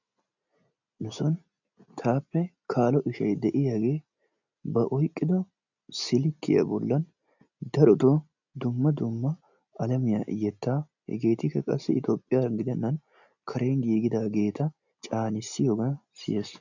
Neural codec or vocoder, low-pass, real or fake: none; 7.2 kHz; real